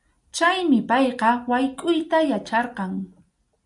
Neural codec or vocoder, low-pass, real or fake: none; 10.8 kHz; real